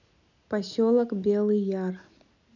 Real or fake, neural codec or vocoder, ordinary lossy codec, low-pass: real; none; none; 7.2 kHz